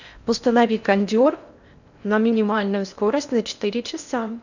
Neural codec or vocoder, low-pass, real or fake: codec, 16 kHz in and 24 kHz out, 0.6 kbps, FocalCodec, streaming, 4096 codes; 7.2 kHz; fake